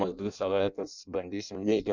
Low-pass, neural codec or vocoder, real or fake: 7.2 kHz; codec, 16 kHz in and 24 kHz out, 0.6 kbps, FireRedTTS-2 codec; fake